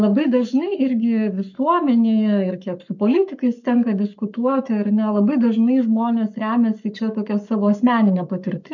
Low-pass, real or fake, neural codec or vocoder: 7.2 kHz; fake; codec, 44.1 kHz, 7.8 kbps, Pupu-Codec